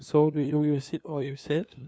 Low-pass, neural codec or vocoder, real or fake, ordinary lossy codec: none; codec, 16 kHz, 2 kbps, FunCodec, trained on LibriTTS, 25 frames a second; fake; none